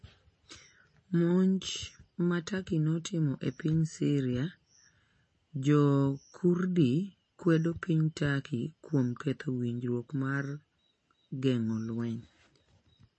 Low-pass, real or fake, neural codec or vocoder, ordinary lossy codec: 10.8 kHz; real; none; MP3, 32 kbps